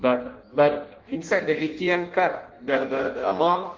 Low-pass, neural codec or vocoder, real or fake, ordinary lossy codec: 7.2 kHz; codec, 16 kHz in and 24 kHz out, 0.6 kbps, FireRedTTS-2 codec; fake; Opus, 16 kbps